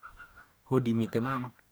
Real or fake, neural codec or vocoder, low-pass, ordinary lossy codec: fake; codec, 44.1 kHz, 2.6 kbps, DAC; none; none